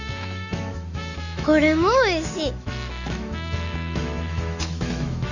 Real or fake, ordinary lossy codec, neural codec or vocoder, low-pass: fake; none; autoencoder, 48 kHz, 128 numbers a frame, DAC-VAE, trained on Japanese speech; 7.2 kHz